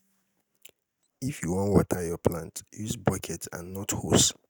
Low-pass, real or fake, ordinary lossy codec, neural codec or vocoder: none; real; none; none